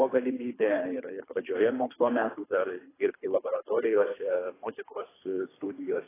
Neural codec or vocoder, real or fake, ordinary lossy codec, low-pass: codec, 24 kHz, 3 kbps, HILCodec; fake; AAC, 16 kbps; 3.6 kHz